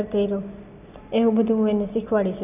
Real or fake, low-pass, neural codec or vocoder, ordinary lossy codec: real; 3.6 kHz; none; none